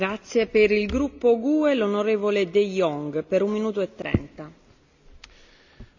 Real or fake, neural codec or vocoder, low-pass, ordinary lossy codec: real; none; 7.2 kHz; none